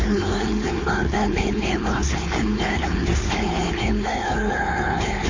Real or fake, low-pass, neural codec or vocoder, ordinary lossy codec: fake; 7.2 kHz; codec, 16 kHz, 4.8 kbps, FACodec; AAC, 32 kbps